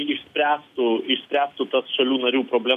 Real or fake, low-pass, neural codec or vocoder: real; 14.4 kHz; none